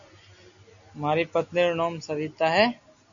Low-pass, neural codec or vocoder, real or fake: 7.2 kHz; none; real